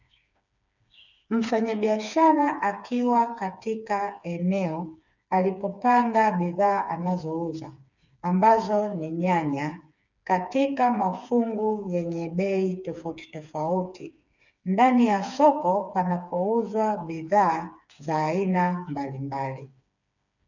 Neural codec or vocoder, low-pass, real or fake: codec, 16 kHz, 4 kbps, FreqCodec, smaller model; 7.2 kHz; fake